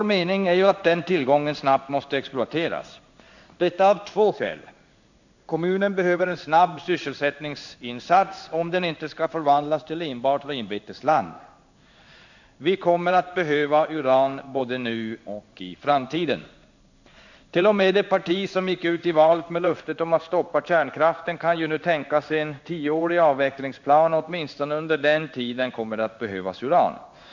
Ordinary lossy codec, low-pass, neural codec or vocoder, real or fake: none; 7.2 kHz; codec, 16 kHz in and 24 kHz out, 1 kbps, XY-Tokenizer; fake